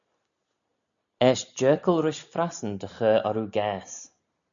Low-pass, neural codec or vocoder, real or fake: 7.2 kHz; none; real